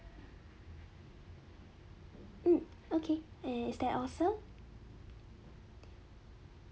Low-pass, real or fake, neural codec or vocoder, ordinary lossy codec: none; real; none; none